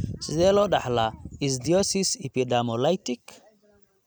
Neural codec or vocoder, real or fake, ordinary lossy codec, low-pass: none; real; none; none